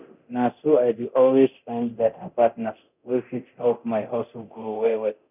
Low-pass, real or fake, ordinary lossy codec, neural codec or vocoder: 3.6 kHz; fake; none; codec, 24 kHz, 0.9 kbps, DualCodec